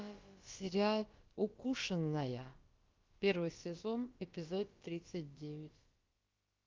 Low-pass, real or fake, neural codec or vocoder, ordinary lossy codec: 7.2 kHz; fake; codec, 16 kHz, about 1 kbps, DyCAST, with the encoder's durations; Opus, 32 kbps